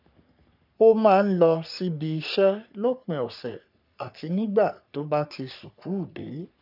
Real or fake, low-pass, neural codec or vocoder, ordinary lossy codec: fake; 5.4 kHz; codec, 44.1 kHz, 3.4 kbps, Pupu-Codec; none